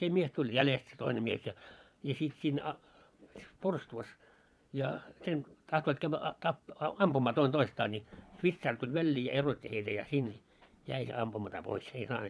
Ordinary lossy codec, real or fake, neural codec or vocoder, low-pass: none; fake; vocoder, 22.05 kHz, 80 mel bands, Vocos; none